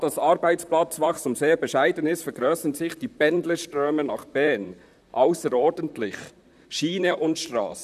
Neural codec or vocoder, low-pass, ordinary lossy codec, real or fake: vocoder, 44.1 kHz, 128 mel bands, Pupu-Vocoder; 14.4 kHz; none; fake